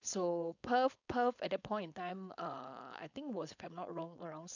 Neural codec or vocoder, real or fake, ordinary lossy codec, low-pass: codec, 16 kHz, 4.8 kbps, FACodec; fake; none; 7.2 kHz